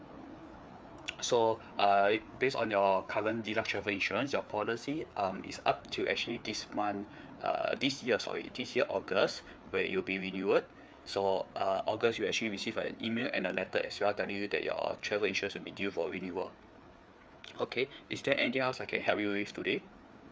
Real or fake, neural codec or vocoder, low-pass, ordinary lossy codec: fake; codec, 16 kHz, 4 kbps, FreqCodec, larger model; none; none